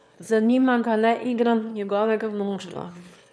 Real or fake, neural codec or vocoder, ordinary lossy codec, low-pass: fake; autoencoder, 22.05 kHz, a latent of 192 numbers a frame, VITS, trained on one speaker; none; none